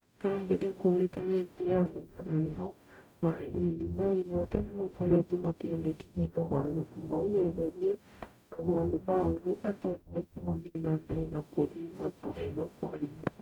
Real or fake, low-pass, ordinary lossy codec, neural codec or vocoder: fake; 19.8 kHz; none; codec, 44.1 kHz, 0.9 kbps, DAC